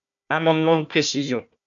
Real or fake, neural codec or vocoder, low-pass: fake; codec, 16 kHz, 1 kbps, FunCodec, trained on Chinese and English, 50 frames a second; 7.2 kHz